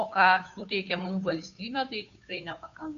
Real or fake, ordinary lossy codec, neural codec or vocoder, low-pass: fake; AAC, 96 kbps; codec, 16 kHz, 2 kbps, FunCodec, trained on Chinese and English, 25 frames a second; 7.2 kHz